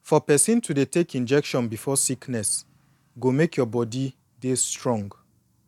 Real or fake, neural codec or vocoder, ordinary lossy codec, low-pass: real; none; none; 19.8 kHz